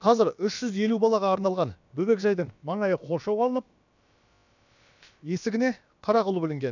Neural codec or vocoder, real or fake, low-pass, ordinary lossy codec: codec, 16 kHz, about 1 kbps, DyCAST, with the encoder's durations; fake; 7.2 kHz; none